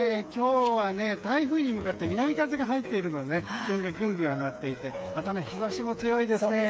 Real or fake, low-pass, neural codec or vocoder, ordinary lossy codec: fake; none; codec, 16 kHz, 4 kbps, FreqCodec, smaller model; none